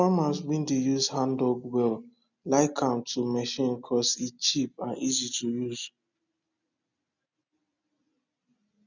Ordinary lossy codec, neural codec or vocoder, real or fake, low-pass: none; none; real; 7.2 kHz